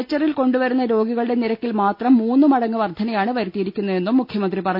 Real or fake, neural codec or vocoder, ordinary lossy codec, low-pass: real; none; none; 5.4 kHz